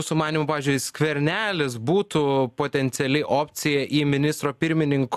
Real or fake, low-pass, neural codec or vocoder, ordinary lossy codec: real; 14.4 kHz; none; AAC, 96 kbps